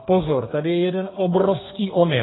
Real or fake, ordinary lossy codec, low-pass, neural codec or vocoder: fake; AAC, 16 kbps; 7.2 kHz; codec, 32 kHz, 1.9 kbps, SNAC